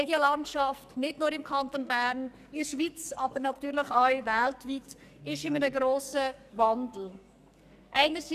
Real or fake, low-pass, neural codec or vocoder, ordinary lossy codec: fake; 14.4 kHz; codec, 44.1 kHz, 2.6 kbps, SNAC; none